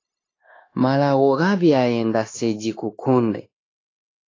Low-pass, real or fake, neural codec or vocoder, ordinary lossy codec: 7.2 kHz; fake; codec, 16 kHz, 0.9 kbps, LongCat-Audio-Codec; AAC, 32 kbps